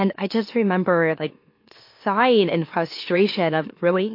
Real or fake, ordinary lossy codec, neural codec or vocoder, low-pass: fake; MP3, 32 kbps; autoencoder, 44.1 kHz, a latent of 192 numbers a frame, MeloTTS; 5.4 kHz